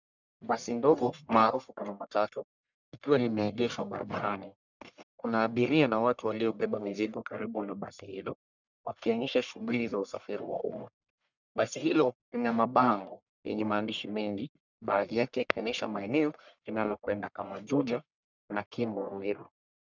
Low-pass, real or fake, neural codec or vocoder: 7.2 kHz; fake; codec, 44.1 kHz, 1.7 kbps, Pupu-Codec